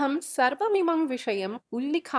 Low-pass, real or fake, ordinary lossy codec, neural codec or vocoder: none; fake; none; autoencoder, 22.05 kHz, a latent of 192 numbers a frame, VITS, trained on one speaker